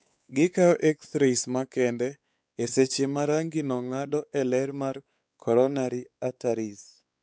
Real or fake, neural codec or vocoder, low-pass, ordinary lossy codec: fake; codec, 16 kHz, 4 kbps, X-Codec, WavLM features, trained on Multilingual LibriSpeech; none; none